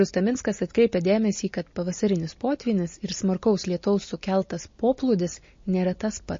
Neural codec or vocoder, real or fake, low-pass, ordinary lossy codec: none; real; 7.2 kHz; MP3, 32 kbps